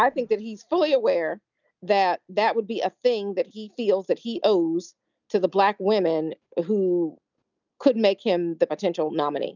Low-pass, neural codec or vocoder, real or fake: 7.2 kHz; none; real